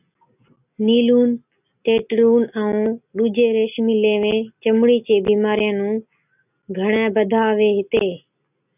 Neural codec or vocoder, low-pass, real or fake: none; 3.6 kHz; real